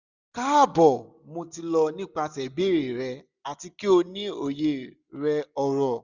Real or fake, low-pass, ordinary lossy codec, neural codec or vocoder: real; 7.2 kHz; none; none